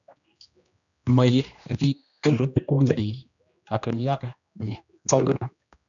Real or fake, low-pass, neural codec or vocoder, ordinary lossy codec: fake; 7.2 kHz; codec, 16 kHz, 1 kbps, X-Codec, HuBERT features, trained on general audio; MP3, 96 kbps